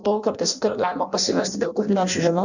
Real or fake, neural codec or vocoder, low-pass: fake; codec, 16 kHz in and 24 kHz out, 0.6 kbps, FireRedTTS-2 codec; 7.2 kHz